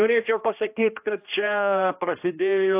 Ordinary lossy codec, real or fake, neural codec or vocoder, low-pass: AAC, 32 kbps; fake; codec, 16 kHz, 1 kbps, X-Codec, HuBERT features, trained on general audio; 3.6 kHz